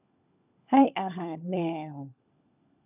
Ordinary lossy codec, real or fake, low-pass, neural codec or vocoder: none; fake; 3.6 kHz; codec, 16 kHz, 16 kbps, FunCodec, trained on LibriTTS, 50 frames a second